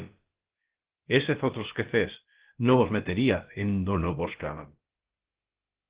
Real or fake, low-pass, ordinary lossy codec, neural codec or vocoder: fake; 3.6 kHz; Opus, 16 kbps; codec, 16 kHz, about 1 kbps, DyCAST, with the encoder's durations